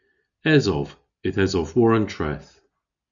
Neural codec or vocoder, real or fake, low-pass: none; real; 7.2 kHz